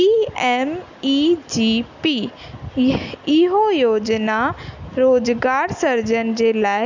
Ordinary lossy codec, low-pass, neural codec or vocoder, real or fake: none; 7.2 kHz; none; real